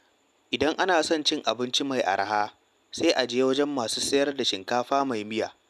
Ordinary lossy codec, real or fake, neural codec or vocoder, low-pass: none; real; none; 14.4 kHz